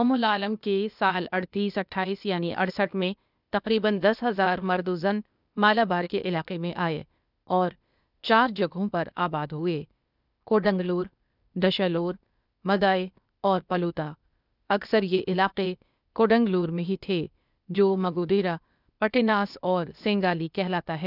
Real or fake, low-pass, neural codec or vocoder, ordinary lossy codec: fake; 5.4 kHz; codec, 16 kHz, 0.8 kbps, ZipCodec; none